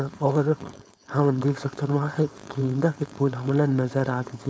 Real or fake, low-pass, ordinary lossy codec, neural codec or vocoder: fake; none; none; codec, 16 kHz, 4.8 kbps, FACodec